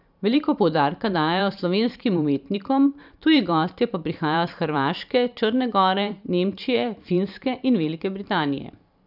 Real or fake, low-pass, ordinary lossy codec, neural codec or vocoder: fake; 5.4 kHz; none; vocoder, 44.1 kHz, 128 mel bands every 512 samples, BigVGAN v2